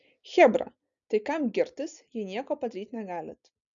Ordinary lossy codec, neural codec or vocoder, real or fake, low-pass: MP3, 96 kbps; none; real; 7.2 kHz